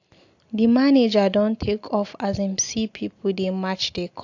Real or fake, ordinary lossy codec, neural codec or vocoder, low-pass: real; AAC, 48 kbps; none; 7.2 kHz